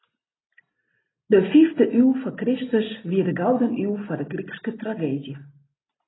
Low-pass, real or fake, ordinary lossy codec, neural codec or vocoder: 7.2 kHz; real; AAC, 16 kbps; none